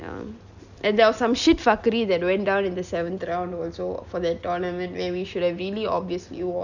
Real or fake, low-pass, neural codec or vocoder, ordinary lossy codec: real; 7.2 kHz; none; none